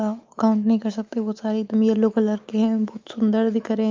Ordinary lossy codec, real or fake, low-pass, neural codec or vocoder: Opus, 24 kbps; fake; 7.2 kHz; autoencoder, 48 kHz, 128 numbers a frame, DAC-VAE, trained on Japanese speech